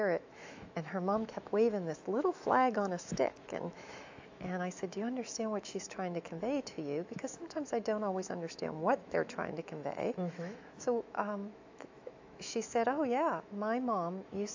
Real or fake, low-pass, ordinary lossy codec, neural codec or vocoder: fake; 7.2 kHz; AAC, 48 kbps; autoencoder, 48 kHz, 128 numbers a frame, DAC-VAE, trained on Japanese speech